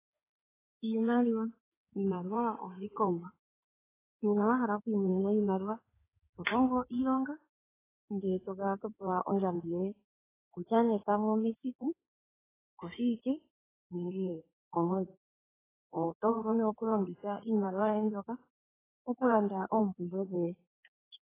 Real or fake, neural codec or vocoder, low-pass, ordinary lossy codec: fake; codec, 16 kHz in and 24 kHz out, 2.2 kbps, FireRedTTS-2 codec; 3.6 kHz; AAC, 16 kbps